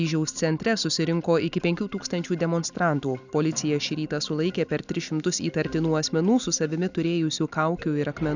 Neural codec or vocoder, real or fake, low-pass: none; real; 7.2 kHz